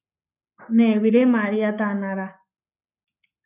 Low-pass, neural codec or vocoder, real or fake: 3.6 kHz; codec, 44.1 kHz, 7.8 kbps, Pupu-Codec; fake